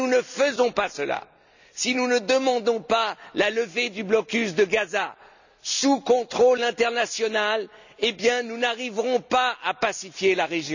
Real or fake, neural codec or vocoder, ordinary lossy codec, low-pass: real; none; none; 7.2 kHz